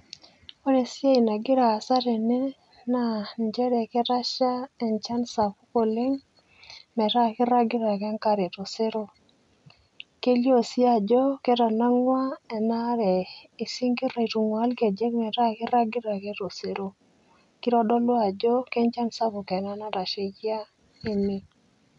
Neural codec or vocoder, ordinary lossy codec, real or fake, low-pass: none; none; real; 10.8 kHz